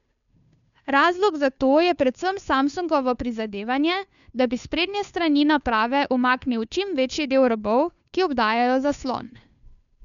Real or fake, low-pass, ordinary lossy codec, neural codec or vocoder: fake; 7.2 kHz; none; codec, 16 kHz, 2 kbps, FunCodec, trained on Chinese and English, 25 frames a second